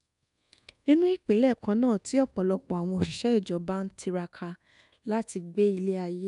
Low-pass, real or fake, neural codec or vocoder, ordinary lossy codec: 10.8 kHz; fake; codec, 24 kHz, 1.2 kbps, DualCodec; none